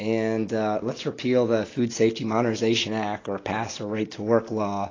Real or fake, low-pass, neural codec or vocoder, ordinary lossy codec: real; 7.2 kHz; none; AAC, 32 kbps